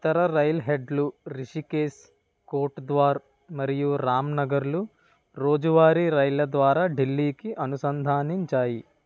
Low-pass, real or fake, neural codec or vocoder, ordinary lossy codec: none; real; none; none